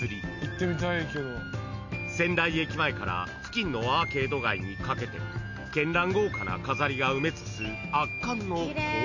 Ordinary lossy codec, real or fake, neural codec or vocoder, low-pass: none; real; none; 7.2 kHz